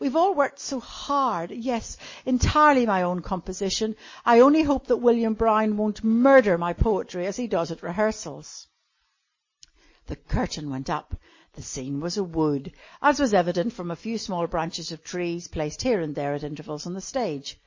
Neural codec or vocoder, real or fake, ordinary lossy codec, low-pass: none; real; MP3, 32 kbps; 7.2 kHz